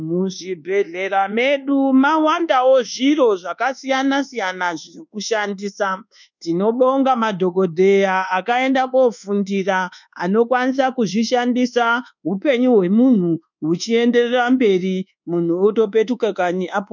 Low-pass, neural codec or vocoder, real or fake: 7.2 kHz; codec, 24 kHz, 1.2 kbps, DualCodec; fake